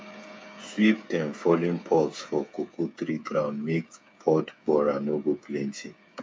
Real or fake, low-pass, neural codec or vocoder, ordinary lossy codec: fake; none; codec, 16 kHz, 8 kbps, FreqCodec, smaller model; none